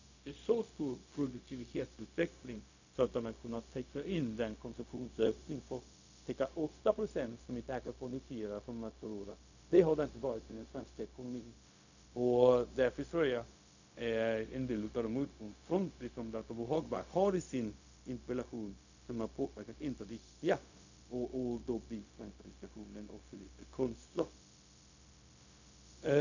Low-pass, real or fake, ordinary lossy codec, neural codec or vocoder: 7.2 kHz; fake; none; codec, 16 kHz, 0.4 kbps, LongCat-Audio-Codec